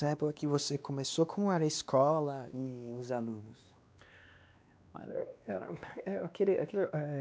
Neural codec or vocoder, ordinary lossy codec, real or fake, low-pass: codec, 16 kHz, 2 kbps, X-Codec, WavLM features, trained on Multilingual LibriSpeech; none; fake; none